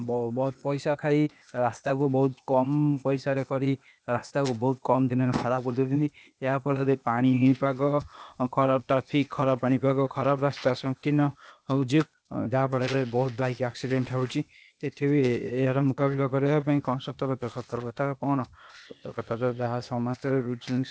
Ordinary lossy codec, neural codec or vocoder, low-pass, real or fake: none; codec, 16 kHz, 0.8 kbps, ZipCodec; none; fake